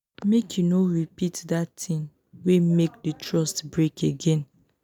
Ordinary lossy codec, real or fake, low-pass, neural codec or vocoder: none; real; 19.8 kHz; none